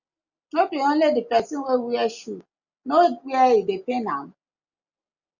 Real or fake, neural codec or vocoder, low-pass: real; none; 7.2 kHz